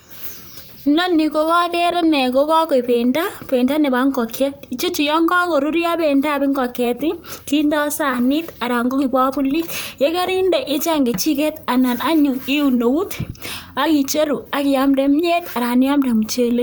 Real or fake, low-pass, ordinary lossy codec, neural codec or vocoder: fake; none; none; vocoder, 44.1 kHz, 128 mel bands, Pupu-Vocoder